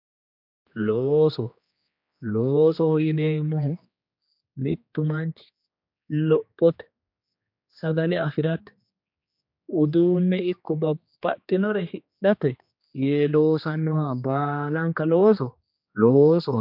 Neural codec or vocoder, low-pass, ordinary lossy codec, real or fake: codec, 16 kHz, 2 kbps, X-Codec, HuBERT features, trained on general audio; 5.4 kHz; MP3, 48 kbps; fake